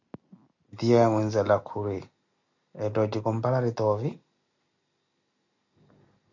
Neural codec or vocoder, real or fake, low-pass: none; real; 7.2 kHz